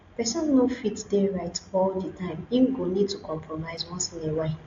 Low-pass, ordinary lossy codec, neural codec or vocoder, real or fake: 7.2 kHz; AAC, 48 kbps; none; real